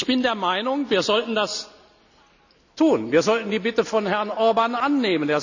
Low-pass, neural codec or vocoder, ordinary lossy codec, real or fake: 7.2 kHz; none; none; real